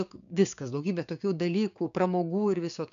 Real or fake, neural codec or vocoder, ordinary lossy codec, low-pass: fake; codec, 16 kHz, 6 kbps, DAC; AAC, 64 kbps; 7.2 kHz